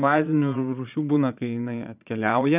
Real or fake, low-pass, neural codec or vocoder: fake; 3.6 kHz; vocoder, 22.05 kHz, 80 mel bands, Vocos